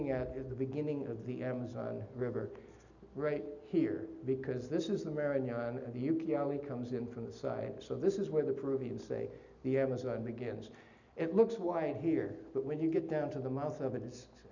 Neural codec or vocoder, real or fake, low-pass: none; real; 7.2 kHz